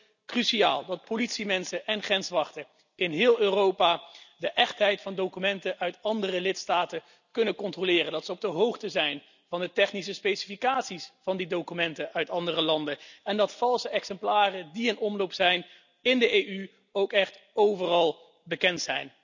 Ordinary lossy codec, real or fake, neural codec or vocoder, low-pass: none; real; none; 7.2 kHz